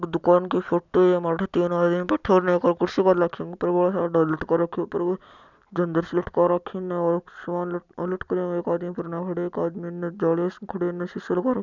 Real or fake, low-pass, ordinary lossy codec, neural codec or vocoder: real; 7.2 kHz; none; none